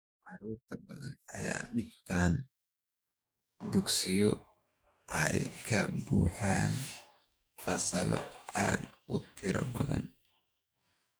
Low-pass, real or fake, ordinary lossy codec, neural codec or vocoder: none; fake; none; codec, 44.1 kHz, 2.6 kbps, DAC